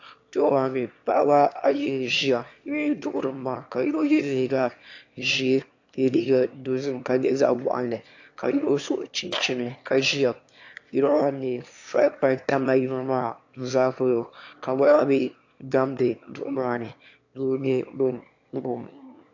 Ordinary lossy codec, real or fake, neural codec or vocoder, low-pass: AAC, 48 kbps; fake; autoencoder, 22.05 kHz, a latent of 192 numbers a frame, VITS, trained on one speaker; 7.2 kHz